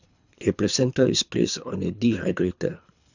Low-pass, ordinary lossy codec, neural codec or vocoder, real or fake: 7.2 kHz; none; codec, 24 kHz, 3 kbps, HILCodec; fake